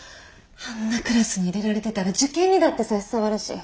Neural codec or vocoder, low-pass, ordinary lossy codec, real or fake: none; none; none; real